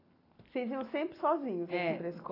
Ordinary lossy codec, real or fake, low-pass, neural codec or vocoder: AAC, 24 kbps; real; 5.4 kHz; none